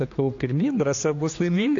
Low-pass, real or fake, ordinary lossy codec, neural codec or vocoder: 7.2 kHz; fake; AAC, 48 kbps; codec, 16 kHz, 2 kbps, X-Codec, HuBERT features, trained on general audio